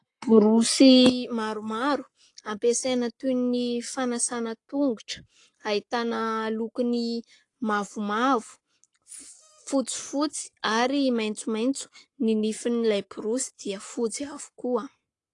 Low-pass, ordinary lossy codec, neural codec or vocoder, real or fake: 10.8 kHz; AAC, 48 kbps; codec, 44.1 kHz, 7.8 kbps, Pupu-Codec; fake